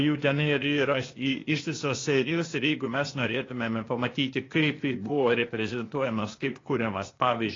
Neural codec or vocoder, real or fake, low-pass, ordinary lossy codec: codec, 16 kHz, 0.8 kbps, ZipCodec; fake; 7.2 kHz; AAC, 32 kbps